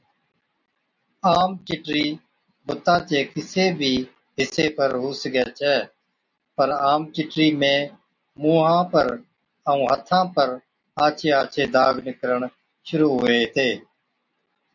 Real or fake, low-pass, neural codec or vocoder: real; 7.2 kHz; none